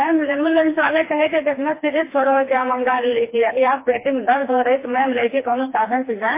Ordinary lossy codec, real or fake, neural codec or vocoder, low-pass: MP3, 24 kbps; fake; codec, 16 kHz, 2 kbps, FreqCodec, smaller model; 3.6 kHz